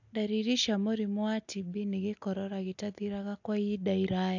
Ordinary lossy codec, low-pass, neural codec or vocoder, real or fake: AAC, 48 kbps; 7.2 kHz; none; real